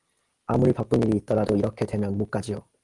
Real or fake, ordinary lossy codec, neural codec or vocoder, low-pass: real; Opus, 32 kbps; none; 10.8 kHz